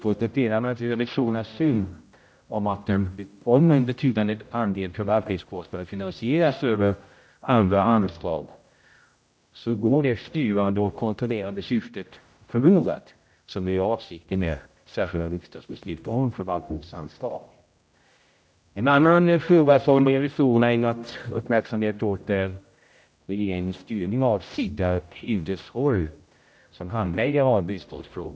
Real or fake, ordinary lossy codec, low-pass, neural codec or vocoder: fake; none; none; codec, 16 kHz, 0.5 kbps, X-Codec, HuBERT features, trained on general audio